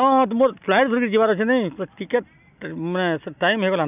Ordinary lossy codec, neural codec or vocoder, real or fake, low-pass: none; none; real; 3.6 kHz